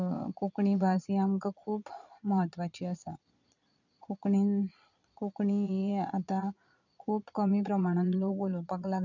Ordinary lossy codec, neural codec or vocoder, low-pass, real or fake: none; vocoder, 22.05 kHz, 80 mel bands, Vocos; 7.2 kHz; fake